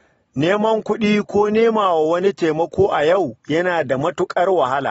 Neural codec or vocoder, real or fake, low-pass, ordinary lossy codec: none; real; 19.8 kHz; AAC, 24 kbps